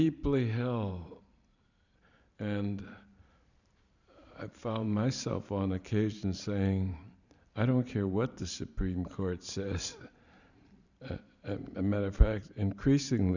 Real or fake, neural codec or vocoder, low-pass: fake; vocoder, 44.1 kHz, 128 mel bands every 512 samples, BigVGAN v2; 7.2 kHz